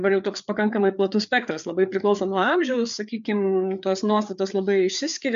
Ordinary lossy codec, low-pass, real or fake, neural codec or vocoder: MP3, 64 kbps; 7.2 kHz; fake; codec, 16 kHz, 4 kbps, FreqCodec, larger model